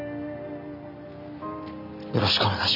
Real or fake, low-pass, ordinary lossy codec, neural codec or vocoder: real; 5.4 kHz; none; none